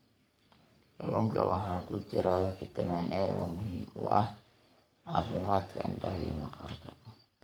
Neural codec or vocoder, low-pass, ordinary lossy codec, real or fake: codec, 44.1 kHz, 3.4 kbps, Pupu-Codec; none; none; fake